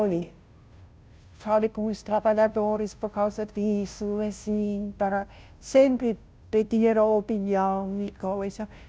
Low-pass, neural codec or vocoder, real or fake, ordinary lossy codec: none; codec, 16 kHz, 0.5 kbps, FunCodec, trained on Chinese and English, 25 frames a second; fake; none